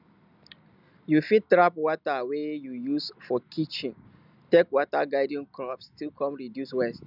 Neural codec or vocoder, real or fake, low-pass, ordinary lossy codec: none; real; 5.4 kHz; none